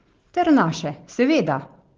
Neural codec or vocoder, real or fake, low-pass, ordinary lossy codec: none; real; 7.2 kHz; Opus, 16 kbps